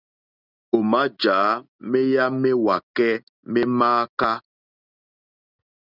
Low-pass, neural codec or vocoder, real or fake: 5.4 kHz; none; real